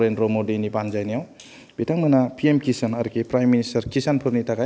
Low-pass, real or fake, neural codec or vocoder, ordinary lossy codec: none; real; none; none